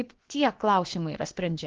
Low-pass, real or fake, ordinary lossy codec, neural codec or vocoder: 7.2 kHz; fake; Opus, 16 kbps; codec, 16 kHz, about 1 kbps, DyCAST, with the encoder's durations